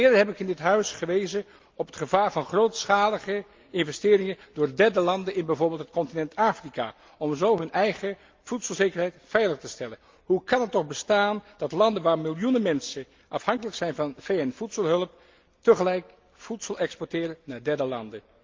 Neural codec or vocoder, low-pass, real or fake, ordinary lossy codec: none; 7.2 kHz; real; Opus, 24 kbps